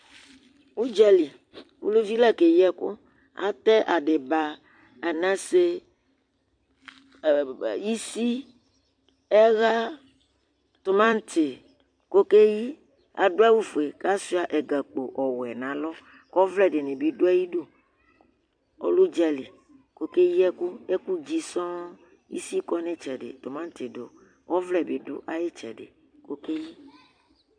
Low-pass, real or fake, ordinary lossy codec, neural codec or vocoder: 9.9 kHz; fake; MP3, 64 kbps; vocoder, 44.1 kHz, 128 mel bands every 256 samples, BigVGAN v2